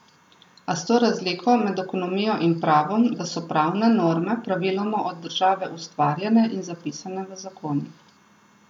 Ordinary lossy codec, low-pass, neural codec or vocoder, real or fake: none; 19.8 kHz; none; real